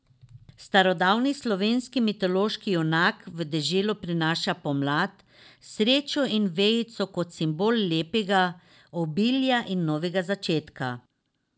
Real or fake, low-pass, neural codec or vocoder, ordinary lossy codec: real; none; none; none